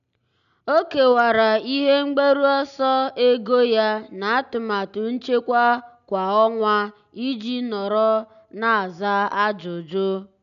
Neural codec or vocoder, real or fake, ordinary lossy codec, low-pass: none; real; none; 7.2 kHz